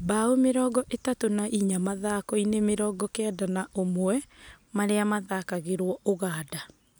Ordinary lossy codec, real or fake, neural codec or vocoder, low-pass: none; real; none; none